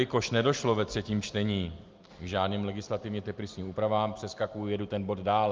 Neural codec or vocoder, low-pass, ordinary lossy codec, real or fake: none; 7.2 kHz; Opus, 24 kbps; real